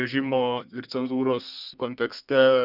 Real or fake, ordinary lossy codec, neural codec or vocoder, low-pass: fake; Opus, 64 kbps; codec, 32 kHz, 1.9 kbps, SNAC; 5.4 kHz